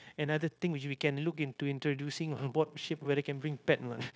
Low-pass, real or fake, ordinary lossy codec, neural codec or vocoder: none; fake; none; codec, 16 kHz, 0.9 kbps, LongCat-Audio-Codec